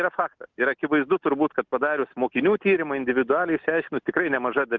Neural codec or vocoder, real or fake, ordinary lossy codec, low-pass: none; real; Opus, 32 kbps; 7.2 kHz